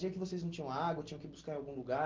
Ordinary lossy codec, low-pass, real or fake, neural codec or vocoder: Opus, 16 kbps; 7.2 kHz; real; none